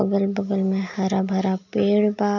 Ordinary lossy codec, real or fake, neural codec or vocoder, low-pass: none; real; none; 7.2 kHz